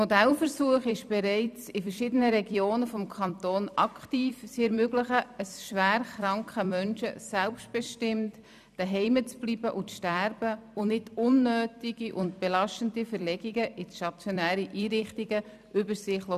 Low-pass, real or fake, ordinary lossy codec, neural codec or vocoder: 14.4 kHz; fake; none; vocoder, 44.1 kHz, 128 mel bands every 256 samples, BigVGAN v2